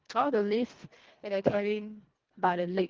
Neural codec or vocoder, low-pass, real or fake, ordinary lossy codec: codec, 24 kHz, 1.5 kbps, HILCodec; 7.2 kHz; fake; Opus, 16 kbps